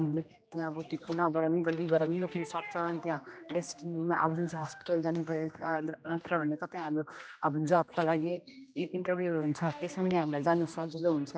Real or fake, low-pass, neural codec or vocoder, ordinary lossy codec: fake; none; codec, 16 kHz, 1 kbps, X-Codec, HuBERT features, trained on general audio; none